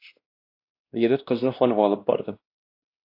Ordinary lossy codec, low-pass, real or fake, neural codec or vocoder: AAC, 32 kbps; 5.4 kHz; fake; codec, 16 kHz, 1 kbps, X-Codec, WavLM features, trained on Multilingual LibriSpeech